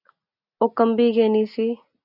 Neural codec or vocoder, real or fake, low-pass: none; real; 5.4 kHz